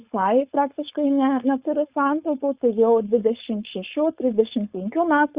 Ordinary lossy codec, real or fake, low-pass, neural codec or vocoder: Opus, 64 kbps; fake; 3.6 kHz; codec, 16 kHz, 4.8 kbps, FACodec